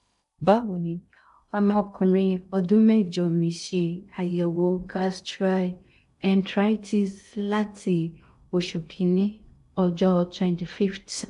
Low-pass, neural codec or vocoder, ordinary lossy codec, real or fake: 10.8 kHz; codec, 16 kHz in and 24 kHz out, 0.6 kbps, FocalCodec, streaming, 2048 codes; AAC, 96 kbps; fake